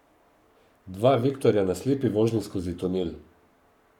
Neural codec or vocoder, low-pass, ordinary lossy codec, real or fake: codec, 44.1 kHz, 7.8 kbps, Pupu-Codec; 19.8 kHz; none; fake